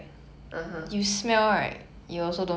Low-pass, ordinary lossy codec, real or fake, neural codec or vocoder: none; none; real; none